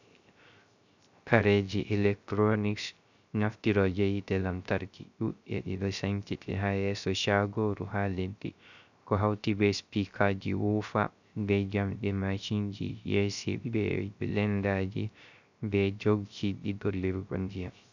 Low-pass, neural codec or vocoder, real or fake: 7.2 kHz; codec, 16 kHz, 0.3 kbps, FocalCodec; fake